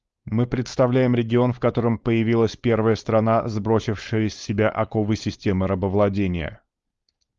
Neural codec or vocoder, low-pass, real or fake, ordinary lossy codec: codec, 16 kHz, 4.8 kbps, FACodec; 7.2 kHz; fake; Opus, 32 kbps